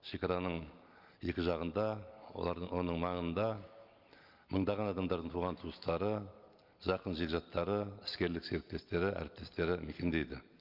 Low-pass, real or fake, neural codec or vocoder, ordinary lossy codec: 5.4 kHz; real; none; Opus, 32 kbps